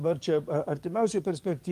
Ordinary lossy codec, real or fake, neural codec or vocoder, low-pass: Opus, 32 kbps; fake; codec, 44.1 kHz, 7.8 kbps, DAC; 14.4 kHz